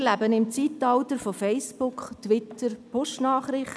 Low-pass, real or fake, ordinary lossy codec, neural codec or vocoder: none; real; none; none